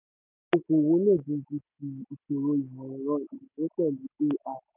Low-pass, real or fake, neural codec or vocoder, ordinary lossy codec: 3.6 kHz; real; none; none